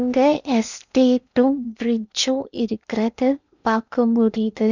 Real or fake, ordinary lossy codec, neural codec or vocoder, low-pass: fake; none; codec, 16 kHz in and 24 kHz out, 0.8 kbps, FocalCodec, streaming, 65536 codes; 7.2 kHz